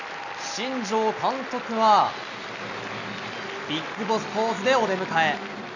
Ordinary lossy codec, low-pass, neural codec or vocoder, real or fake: none; 7.2 kHz; none; real